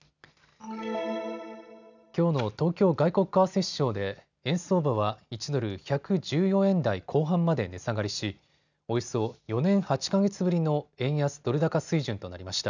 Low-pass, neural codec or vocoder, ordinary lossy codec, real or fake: 7.2 kHz; none; none; real